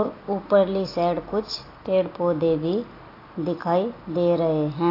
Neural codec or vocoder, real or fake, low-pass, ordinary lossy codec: none; real; 5.4 kHz; AAC, 24 kbps